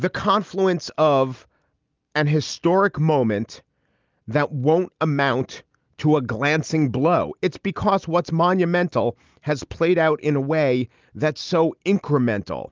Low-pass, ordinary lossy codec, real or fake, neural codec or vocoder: 7.2 kHz; Opus, 32 kbps; real; none